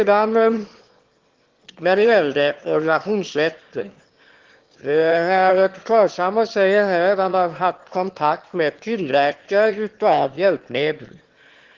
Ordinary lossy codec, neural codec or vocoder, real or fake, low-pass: Opus, 16 kbps; autoencoder, 22.05 kHz, a latent of 192 numbers a frame, VITS, trained on one speaker; fake; 7.2 kHz